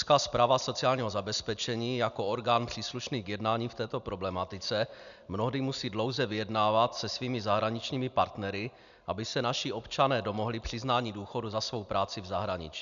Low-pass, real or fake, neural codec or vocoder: 7.2 kHz; real; none